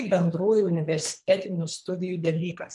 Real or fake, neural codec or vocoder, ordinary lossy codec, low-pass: fake; codec, 24 kHz, 3 kbps, HILCodec; MP3, 96 kbps; 10.8 kHz